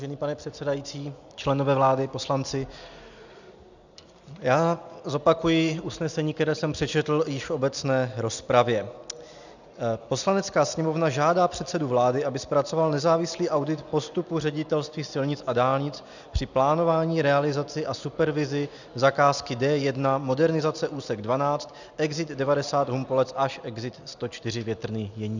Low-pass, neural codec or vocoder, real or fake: 7.2 kHz; none; real